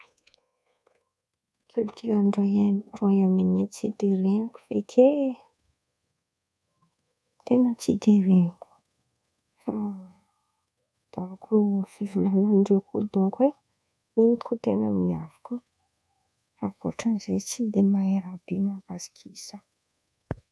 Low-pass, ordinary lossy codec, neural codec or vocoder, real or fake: none; none; codec, 24 kHz, 1.2 kbps, DualCodec; fake